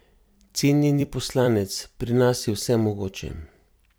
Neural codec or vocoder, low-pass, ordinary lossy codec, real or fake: vocoder, 44.1 kHz, 128 mel bands every 256 samples, BigVGAN v2; none; none; fake